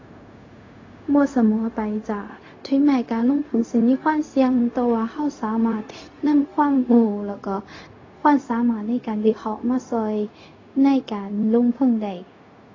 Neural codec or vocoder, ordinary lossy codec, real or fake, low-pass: codec, 16 kHz, 0.4 kbps, LongCat-Audio-Codec; AAC, 32 kbps; fake; 7.2 kHz